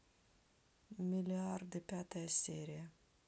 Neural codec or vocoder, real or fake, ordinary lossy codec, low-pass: none; real; none; none